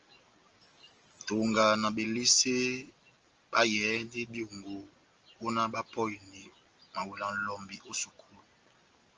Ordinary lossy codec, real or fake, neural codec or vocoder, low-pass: Opus, 24 kbps; real; none; 7.2 kHz